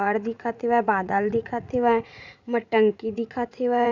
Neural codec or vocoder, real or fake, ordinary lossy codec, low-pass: vocoder, 44.1 kHz, 80 mel bands, Vocos; fake; none; 7.2 kHz